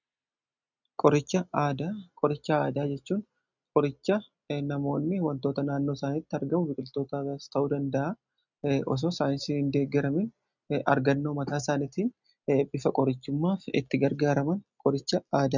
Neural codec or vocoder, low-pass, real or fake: none; 7.2 kHz; real